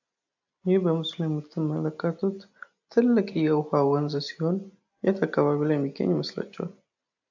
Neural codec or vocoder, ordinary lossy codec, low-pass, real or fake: none; AAC, 48 kbps; 7.2 kHz; real